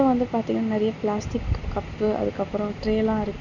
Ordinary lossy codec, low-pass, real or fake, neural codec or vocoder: none; 7.2 kHz; real; none